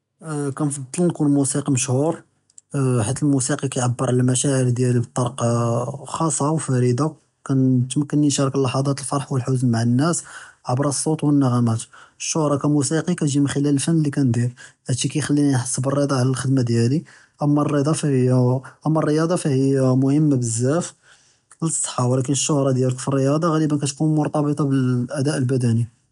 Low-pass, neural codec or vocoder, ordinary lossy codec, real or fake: 10.8 kHz; none; none; real